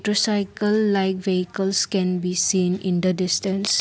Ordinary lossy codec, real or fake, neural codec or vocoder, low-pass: none; real; none; none